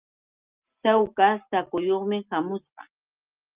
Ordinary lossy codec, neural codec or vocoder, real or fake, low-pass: Opus, 32 kbps; none; real; 3.6 kHz